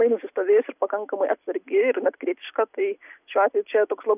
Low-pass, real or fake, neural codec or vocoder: 3.6 kHz; real; none